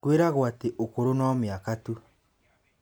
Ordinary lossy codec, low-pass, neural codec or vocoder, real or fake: none; none; none; real